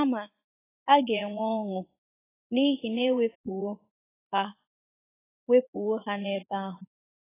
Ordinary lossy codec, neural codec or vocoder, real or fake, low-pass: AAC, 16 kbps; vocoder, 44.1 kHz, 80 mel bands, Vocos; fake; 3.6 kHz